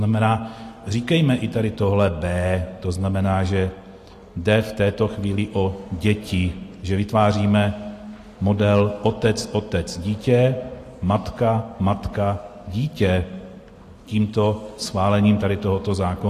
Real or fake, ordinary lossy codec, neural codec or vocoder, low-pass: real; AAC, 48 kbps; none; 14.4 kHz